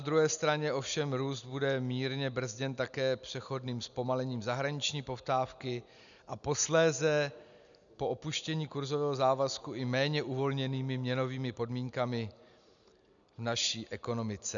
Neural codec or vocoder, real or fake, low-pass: none; real; 7.2 kHz